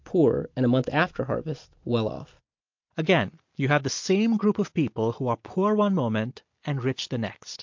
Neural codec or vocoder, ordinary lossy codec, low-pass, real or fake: none; MP3, 48 kbps; 7.2 kHz; real